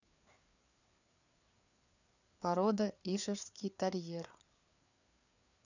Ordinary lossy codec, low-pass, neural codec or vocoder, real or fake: none; 7.2 kHz; codec, 16 kHz, 4 kbps, FreqCodec, larger model; fake